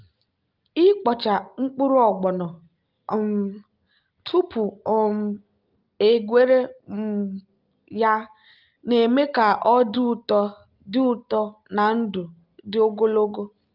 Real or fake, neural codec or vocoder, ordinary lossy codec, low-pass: real; none; Opus, 24 kbps; 5.4 kHz